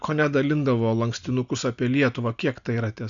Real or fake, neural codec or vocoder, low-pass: real; none; 7.2 kHz